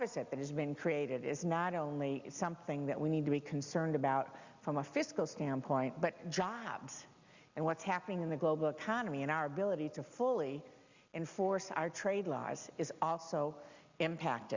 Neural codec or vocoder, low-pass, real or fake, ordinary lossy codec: none; 7.2 kHz; real; Opus, 64 kbps